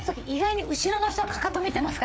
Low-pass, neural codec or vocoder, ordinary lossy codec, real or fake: none; codec, 16 kHz, 4 kbps, FreqCodec, larger model; none; fake